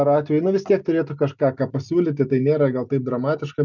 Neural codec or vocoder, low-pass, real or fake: none; 7.2 kHz; real